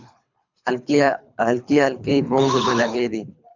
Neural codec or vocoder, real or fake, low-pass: codec, 24 kHz, 3 kbps, HILCodec; fake; 7.2 kHz